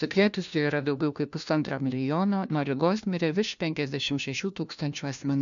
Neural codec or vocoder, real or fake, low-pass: codec, 16 kHz, 1 kbps, FunCodec, trained on LibriTTS, 50 frames a second; fake; 7.2 kHz